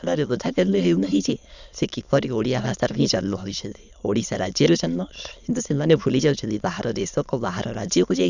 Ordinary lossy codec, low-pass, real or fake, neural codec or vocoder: none; 7.2 kHz; fake; autoencoder, 22.05 kHz, a latent of 192 numbers a frame, VITS, trained on many speakers